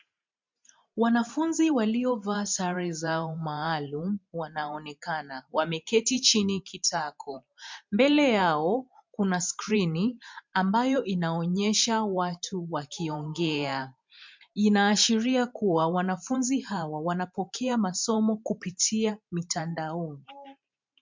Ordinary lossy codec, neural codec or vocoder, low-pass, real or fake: MP3, 64 kbps; vocoder, 44.1 kHz, 128 mel bands every 512 samples, BigVGAN v2; 7.2 kHz; fake